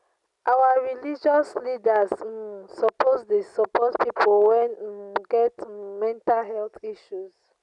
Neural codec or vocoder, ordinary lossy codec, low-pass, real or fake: none; none; 10.8 kHz; real